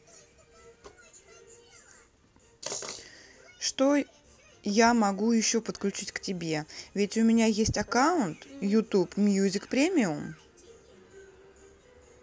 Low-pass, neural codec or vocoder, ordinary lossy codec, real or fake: none; none; none; real